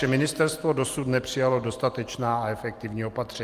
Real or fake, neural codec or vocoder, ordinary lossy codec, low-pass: real; none; Opus, 24 kbps; 14.4 kHz